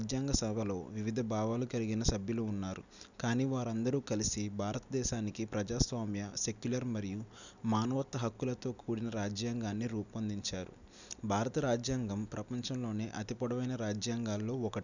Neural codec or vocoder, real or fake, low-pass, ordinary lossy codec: none; real; 7.2 kHz; none